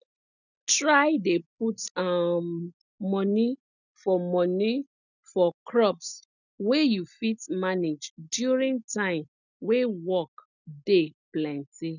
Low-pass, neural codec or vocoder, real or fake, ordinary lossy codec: 7.2 kHz; none; real; none